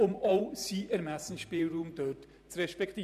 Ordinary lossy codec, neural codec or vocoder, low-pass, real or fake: none; vocoder, 44.1 kHz, 128 mel bands every 512 samples, BigVGAN v2; 14.4 kHz; fake